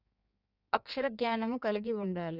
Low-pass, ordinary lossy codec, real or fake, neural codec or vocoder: 5.4 kHz; none; fake; codec, 16 kHz in and 24 kHz out, 1.1 kbps, FireRedTTS-2 codec